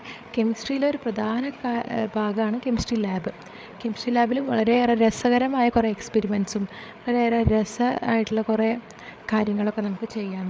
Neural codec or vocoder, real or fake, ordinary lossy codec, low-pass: codec, 16 kHz, 8 kbps, FreqCodec, larger model; fake; none; none